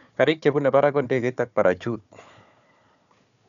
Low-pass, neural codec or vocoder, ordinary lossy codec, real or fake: 7.2 kHz; codec, 16 kHz, 4 kbps, FunCodec, trained on Chinese and English, 50 frames a second; none; fake